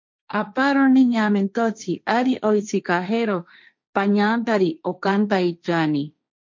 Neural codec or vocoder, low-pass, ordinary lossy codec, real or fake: codec, 16 kHz, 1.1 kbps, Voila-Tokenizer; 7.2 kHz; MP3, 48 kbps; fake